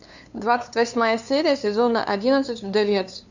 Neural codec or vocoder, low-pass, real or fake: codec, 16 kHz, 2 kbps, FunCodec, trained on LibriTTS, 25 frames a second; 7.2 kHz; fake